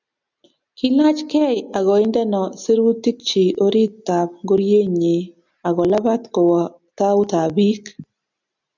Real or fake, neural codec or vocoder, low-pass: real; none; 7.2 kHz